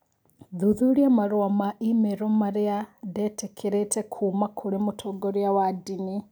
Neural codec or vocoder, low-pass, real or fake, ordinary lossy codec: none; none; real; none